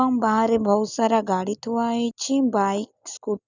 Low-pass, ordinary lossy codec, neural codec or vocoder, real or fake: 7.2 kHz; none; none; real